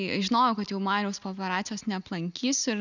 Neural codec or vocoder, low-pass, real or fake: none; 7.2 kHz; real